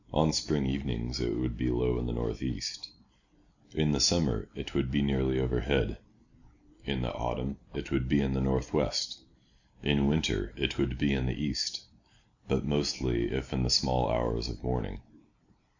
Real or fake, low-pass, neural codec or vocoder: real; 7.2 kHz; none